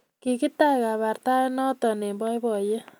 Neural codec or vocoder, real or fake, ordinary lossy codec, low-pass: none; real; none; none